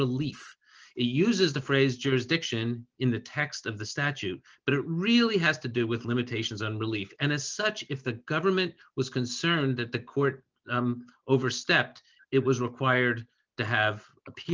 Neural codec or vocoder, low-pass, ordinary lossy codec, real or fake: none; 7.2 kHz; Opus, 24 kbps; real